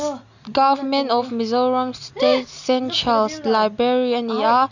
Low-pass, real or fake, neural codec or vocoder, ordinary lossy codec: 7.2 kHz; real; none; none